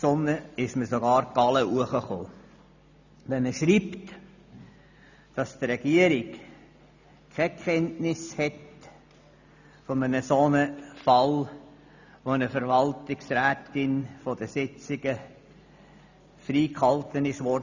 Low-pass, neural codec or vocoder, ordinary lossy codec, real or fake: 7.2 kHz; none; none; real